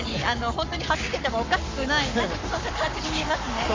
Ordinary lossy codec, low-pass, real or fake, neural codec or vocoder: MP3, 64 kbps; 7.2 kHz; fake; codec, 44.1 kHz, 7.8 kbps, Pupu-Codec